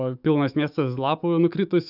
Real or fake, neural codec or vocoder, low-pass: fake; autoencoder, 48 kHz, 128 numbers a frame, DAC-VAE, trained on Japanese speech; 5.4 kHz